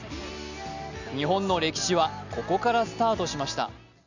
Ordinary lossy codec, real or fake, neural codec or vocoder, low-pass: none; real; none; 7.2 kHz